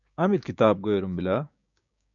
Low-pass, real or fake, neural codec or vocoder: 7.2 kHz; fake; codec, 16 kHz, 6 kbps, DAC